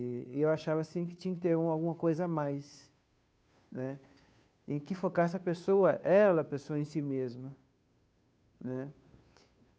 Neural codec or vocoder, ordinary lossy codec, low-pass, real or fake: codec, 16 kHz, 2 kbps, FunCodec, trained on Chinese and English, 25 frames a second; none; none; fake